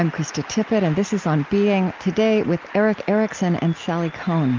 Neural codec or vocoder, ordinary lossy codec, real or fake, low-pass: none; Opus, 32 kbps; real; 7.2 kHz